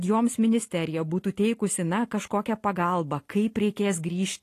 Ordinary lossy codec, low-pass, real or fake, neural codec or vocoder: AAC, 48 kbps; 14.4 kHz; real; none